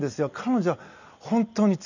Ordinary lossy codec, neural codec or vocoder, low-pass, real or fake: none; none; 7.2 kHz; real